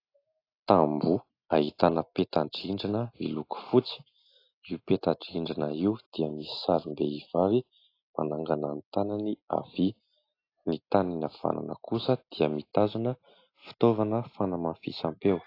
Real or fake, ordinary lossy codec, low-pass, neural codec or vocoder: real; AAC, 32 kbps; 5.4 kHz; none